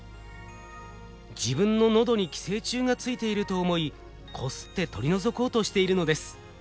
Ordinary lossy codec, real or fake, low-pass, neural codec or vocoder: none; real; none; none